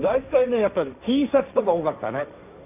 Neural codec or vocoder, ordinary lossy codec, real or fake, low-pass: codec, 16 kHz, 1.1 kbps, Voila-Tokenizer; none; fake; 3.6 kHz